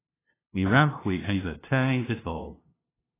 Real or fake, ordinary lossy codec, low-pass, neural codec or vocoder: fake; AAC, 16 kbps; 3.6 kHz; codec, 16 kHz, 0.5 kbps, FunCodec, trained on LibriTTS, 25 frames a second